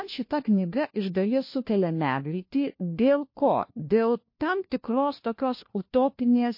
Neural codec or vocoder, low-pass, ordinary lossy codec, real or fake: codec, 16 kHz, 1 kbps, FunCodec, trained on LibriTTS, 50 frames a second; 5.4 kHz; MP3, 32 kbps; fake